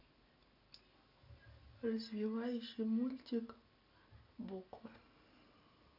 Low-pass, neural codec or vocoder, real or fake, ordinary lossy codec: 5.4 kHz; none; real; none